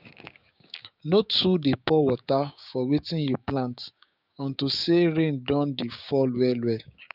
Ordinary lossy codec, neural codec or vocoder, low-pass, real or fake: none; codec, 16 kHz, 6 kbps, DAC; 5.4 kHz; fake